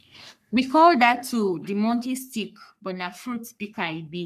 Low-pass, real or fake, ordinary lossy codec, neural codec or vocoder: 14.4 kHz; fake; MP3, 96 kbps; codec, 44.1 kHz, 3.4 kbps, Pupu-Codec